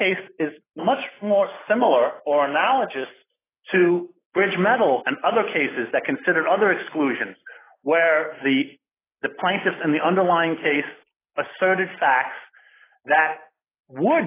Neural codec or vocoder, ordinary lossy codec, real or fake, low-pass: none; AAC, 16 kbps; real; 3.6 kHz